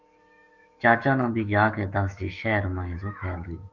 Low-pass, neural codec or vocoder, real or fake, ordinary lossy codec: 7.2 kHz; autoencoder, 48 kHz, 128 numbers a frame, DAC-VAE, trained on Japanese speech; fake; Opus, 32 kbps